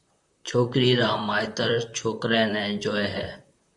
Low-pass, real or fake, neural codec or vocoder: 10.8 kHz; fake; vocoder, 44.1 kHz, 128 mel bands, Pupu-Vocoder